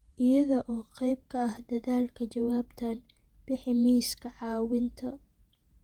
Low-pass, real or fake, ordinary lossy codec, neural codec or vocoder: 19.8 kHz; fake; Opus, 24 kbps; vocoder, 48 kHz, 128 mel bands, Vocos